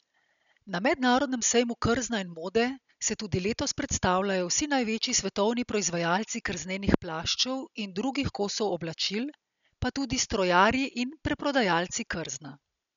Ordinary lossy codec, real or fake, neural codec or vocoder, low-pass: none; real; none; 7.2 kHz